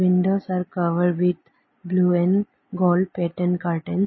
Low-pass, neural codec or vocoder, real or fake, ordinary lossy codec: 7.2 kHz; none; real; MP3, 24 kbps